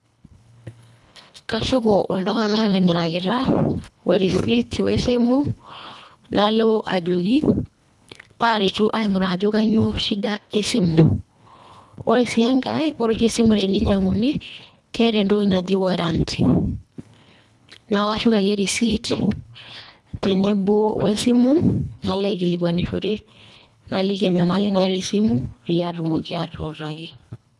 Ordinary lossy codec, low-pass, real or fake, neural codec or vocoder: none; none; fake; codec, 24 kHz, 1.5 kbps, HILCodec